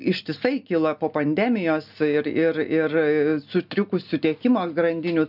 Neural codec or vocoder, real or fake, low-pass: none; real; 5.4 kHz